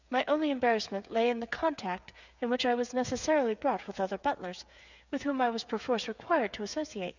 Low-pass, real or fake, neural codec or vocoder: 7.2 kHz; fake; codec, 16 kHz, 8 kbps, FreqCodec, smaller model